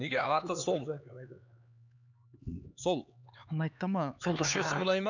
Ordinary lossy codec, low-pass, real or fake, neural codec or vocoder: none; 7.2 kHz; fake; codec, 16 kHz, 4 kbps, X-Codec, HuBERT features, trained on LibriSpeech